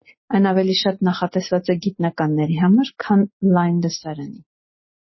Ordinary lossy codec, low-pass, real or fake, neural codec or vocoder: MP3, 24 kbps; 7.2 kHz; real; none